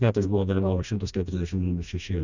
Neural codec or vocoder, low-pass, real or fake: codec, 16 kHz, 1 kbps, FreqCodec, smaller model; 7.2 kHz; fake